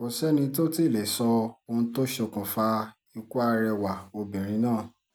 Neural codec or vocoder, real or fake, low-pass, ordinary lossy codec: none; real; none; none